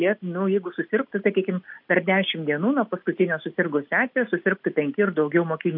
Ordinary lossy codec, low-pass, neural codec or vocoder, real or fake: AAC, 48 kbps; 5.4 kHz; none; real